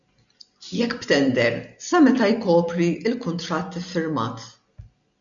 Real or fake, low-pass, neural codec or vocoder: real; 7.2 kHz; none